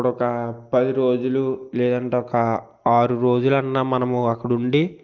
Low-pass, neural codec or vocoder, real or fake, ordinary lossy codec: 7.2 kHz; none; real; Opus, 32 kbps